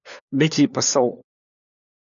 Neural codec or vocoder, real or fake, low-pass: codec, 16 kHz, 4 kbps, FunCodec, trained on LibriTTS, 50 frames a second; fake; 7.2 kHz